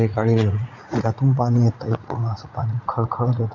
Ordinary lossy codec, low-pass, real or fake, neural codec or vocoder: none; 7.2 kHz; fake; vocoder, 44.1 kHz, 80 mel bands, Vocos